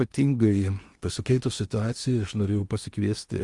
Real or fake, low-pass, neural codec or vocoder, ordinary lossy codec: fake; 10.8 kHz; codec, 16 kHz in and 24 kHz out, 0.8 kbps, FocalCodec, streaming, 65536 codes; Opus, 32 kbps